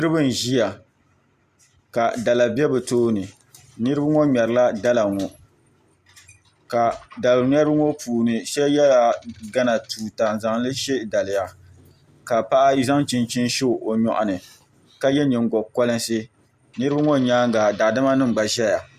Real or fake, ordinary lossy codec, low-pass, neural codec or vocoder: real; Opus, 64 kbps; 14.4 kHz; none